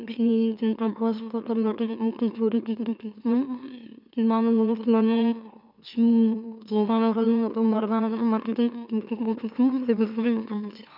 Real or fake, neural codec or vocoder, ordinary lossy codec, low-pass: fake; autoencoder, 44.1 kHz, a latent of 192 numbers a frame, MeloTTS; none; 5.4 kHz